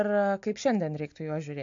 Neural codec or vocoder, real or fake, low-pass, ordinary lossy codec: none; real; 7.2 kHz; AAC, 64 kbps